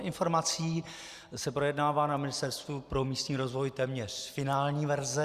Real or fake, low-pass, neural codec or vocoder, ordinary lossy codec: real; 14.4 kHz; none; Opus, 64 kbps